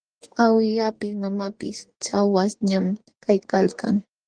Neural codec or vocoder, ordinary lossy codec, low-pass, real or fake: codec, 44.1 kHz, 2.6 kbps, DAC; Opus, 24 kbps; 9.9 kHz; fake